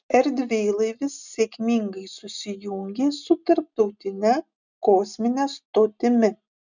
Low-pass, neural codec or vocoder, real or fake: 7.2 kHz; none; real